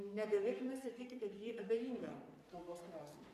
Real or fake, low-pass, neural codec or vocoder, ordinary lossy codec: fake; 14.4 kHz; codec, 44.1 kHz, 3.4 kbps, Pupu-Codec; MP3, 96 kbps